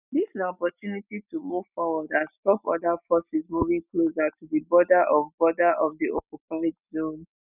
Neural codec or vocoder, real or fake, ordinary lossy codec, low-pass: none; real; none; 3.6 kHz